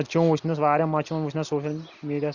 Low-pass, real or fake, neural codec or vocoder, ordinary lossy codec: 7.2 kHz; real; none; Opus, 64 kbps